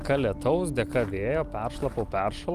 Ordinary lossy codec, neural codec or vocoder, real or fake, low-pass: Opus, 24 kbps; none; real; 14.4 kHz